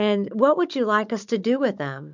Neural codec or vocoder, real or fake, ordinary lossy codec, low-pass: codec, 16 kHz, 16 kbps, FunCodec, trained on Chinese and English, 50 frames a second; fake; MP3, 64 kbps; 7.2 kHz